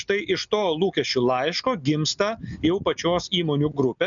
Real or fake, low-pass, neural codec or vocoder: real; 7.2 kHz; none